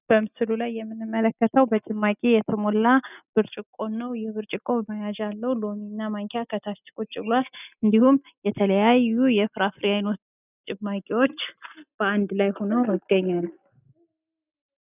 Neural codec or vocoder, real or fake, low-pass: none; real; 3.6 kHz